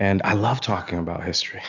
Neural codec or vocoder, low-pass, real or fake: none; 7.2 kHz; real